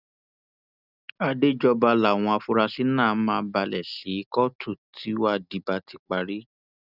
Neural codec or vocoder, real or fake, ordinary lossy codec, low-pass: none; real; none; 5.4 kHz